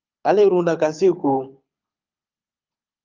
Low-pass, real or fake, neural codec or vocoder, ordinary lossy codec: 7.2 kHz; fake; codec, 24 kHz, 6 kbps, HILCodec; Opus, 24 kbps